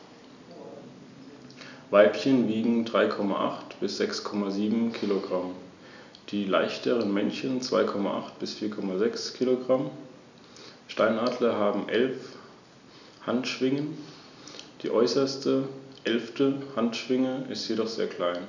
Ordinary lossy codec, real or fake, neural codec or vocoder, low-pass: none; real; none; 7.2 kHz